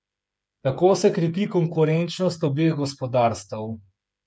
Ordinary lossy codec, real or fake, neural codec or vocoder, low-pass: none; fake; codec, 16 kHz, 8 kbps, FreqCodec, smaller model; none